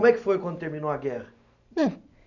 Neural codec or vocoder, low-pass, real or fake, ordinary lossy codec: none; 7.2 kHz; real; none